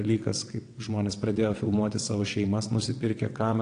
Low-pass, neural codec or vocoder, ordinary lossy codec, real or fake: 9.9 kHz; vocoder, 22.05 kHz, 80 mel bands, WaveNeXt; AAC, 48 kbps; fake